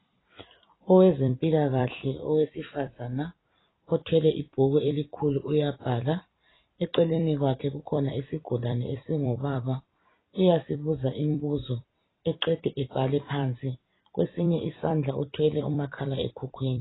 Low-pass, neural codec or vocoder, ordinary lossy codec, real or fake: 7.2 kHz; vocoder, 44.1 kHz, 128 mel bands every 256 samples, BigVGAN v2; AAC, 16 kbps; fake